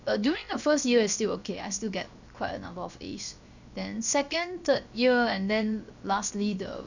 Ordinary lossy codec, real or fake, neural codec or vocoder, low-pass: none; fake; codec, 16 kHz, 0.7 kbps, FocalCodec; 7.2 kHz